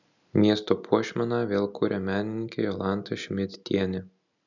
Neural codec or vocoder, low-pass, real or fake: none; 7.2 kHz; real